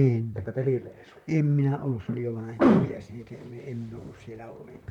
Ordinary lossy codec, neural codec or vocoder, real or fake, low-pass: none; vocoder, 44.1 kHz, 128 mel bands, Pupu-Vocoder; fake; 19.8 kHz